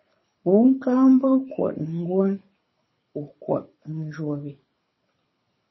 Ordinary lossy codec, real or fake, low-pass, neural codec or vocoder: MP3, 24 kbps; fake; 7.2 kHz; codec, 24 kHz, 6 kbps, HILCodec